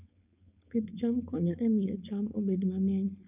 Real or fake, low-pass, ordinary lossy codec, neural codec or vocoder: fake; 3.6 kHz; none; codec, 16 kHz, 4.8 kbps, FACodec